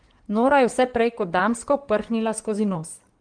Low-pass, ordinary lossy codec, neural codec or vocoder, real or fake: 9.9 kHz; Opus, 24 kbps; codec, 16 kHz in and 24 kHz out, 2.2 kbps, FireRedTTS-2 codec; fake